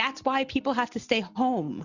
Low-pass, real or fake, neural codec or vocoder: 7.2 kHz; real; none